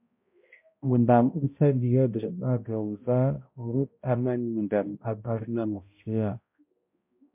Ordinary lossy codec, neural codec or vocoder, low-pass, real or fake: MP3, 32 kbps; codec, 16 kHz, 0.5 kbps, X-Codec, HuBERT features, trained on balanced general audio; 3.6 kHz; fake